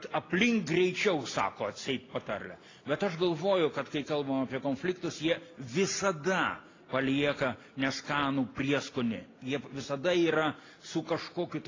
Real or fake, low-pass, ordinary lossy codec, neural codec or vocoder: real; 7.2 kHz; AAC, 32 kbps; none